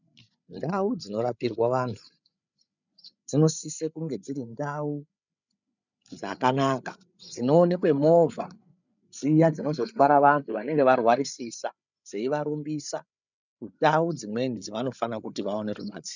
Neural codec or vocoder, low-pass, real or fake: codec, 16 kHz, 8 kbps, FreqCodec, larger model; 7.2 kHz; fake